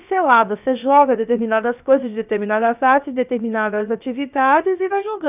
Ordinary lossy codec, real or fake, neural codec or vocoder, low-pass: none; fake; codec, 16 kHz, about 1 kbps, DyCAST, with the encoder's durations; 3.6 kHz